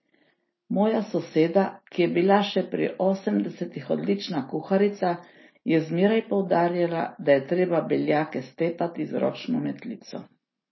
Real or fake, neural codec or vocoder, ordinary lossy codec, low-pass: real; none; MP3, 24 kbps; 7.2 kHz